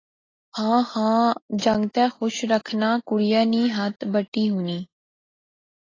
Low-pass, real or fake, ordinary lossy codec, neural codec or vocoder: 7.2 kHz; real; AAC, 32 kbps; none